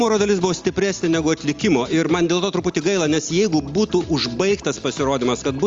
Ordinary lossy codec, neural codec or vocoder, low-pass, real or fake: Opus, 32 kbps; none; 7.2 kHz; real